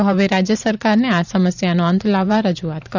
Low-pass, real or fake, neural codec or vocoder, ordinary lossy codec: 7.2 kHz; fake; vocoder, 44.1 kHz, 128 mel bands every 512 samples, BigVGAN v2; none